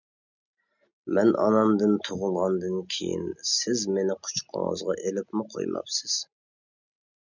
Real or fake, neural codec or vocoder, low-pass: real; none; 7.2 kHz